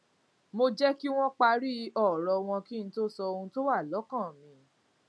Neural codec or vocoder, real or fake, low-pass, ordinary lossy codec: none; real; none; none